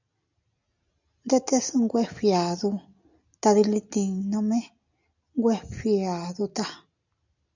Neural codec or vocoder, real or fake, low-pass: none; real; 7.2 kHz